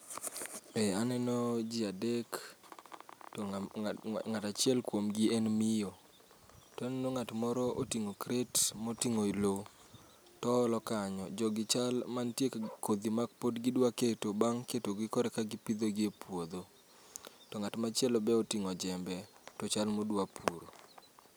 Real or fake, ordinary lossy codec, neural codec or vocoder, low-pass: real; none; none; none